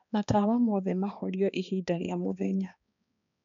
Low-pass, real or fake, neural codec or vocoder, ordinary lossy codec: 7.2 kHz; fake; codec, 16 kHz, 2 kbps, X-Codec, HuBERT features, trained on balanced general audio; none